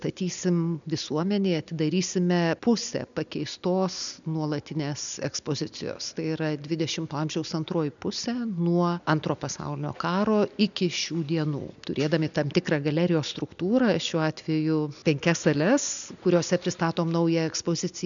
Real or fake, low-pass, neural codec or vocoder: real; 7.2 kHz; none